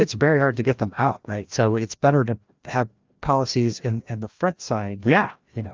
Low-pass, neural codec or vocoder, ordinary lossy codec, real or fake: 7.2 kHz; codec, 16 kHz, 1 kbps, FreqCodec, larger model; Opus, 32 kbps; fake